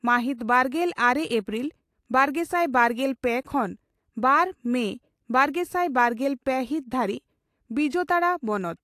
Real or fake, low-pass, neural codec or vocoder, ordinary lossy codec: real; 14.4 kHz; none; AAC, 64 kbps